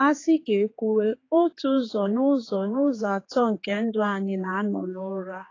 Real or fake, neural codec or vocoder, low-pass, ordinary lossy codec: fake; codec, 16 kHz, 4 kbps, X-Codec, HuBERT features, trained on general audio; 7.2 kHz; AAC, 32 kbps